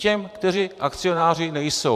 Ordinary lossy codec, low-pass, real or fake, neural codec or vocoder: MP3, 96 kbps; 14.4 kHz; fake; vocoder, 44.1 kHz, 128 mel bands every 512 samples, BigVGAN v2